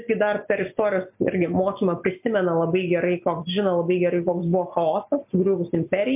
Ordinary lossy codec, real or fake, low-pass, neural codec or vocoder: MP3, 32 kbps; real; 3.6 kHz; none